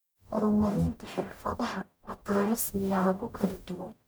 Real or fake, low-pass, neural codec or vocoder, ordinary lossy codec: fake; none; codec, 44.1 kHz, 0.9 kbps, DAC; none